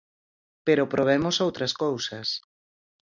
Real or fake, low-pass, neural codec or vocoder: real; 7.2 kHz; none